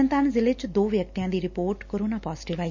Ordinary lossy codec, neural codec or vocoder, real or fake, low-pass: none; none; real; 7.2 kHz